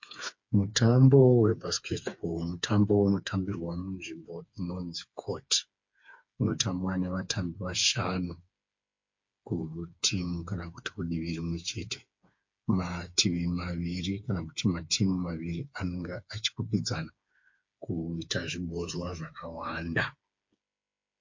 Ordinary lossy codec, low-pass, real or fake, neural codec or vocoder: MP3, 48 kbps; 7.2 kHz; fake; codec, 16 kHz, 4 kbps, FreqCodec, smaller model